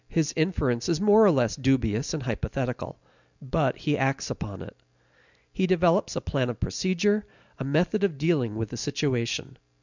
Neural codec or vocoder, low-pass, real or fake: none; 7.2 kHz; real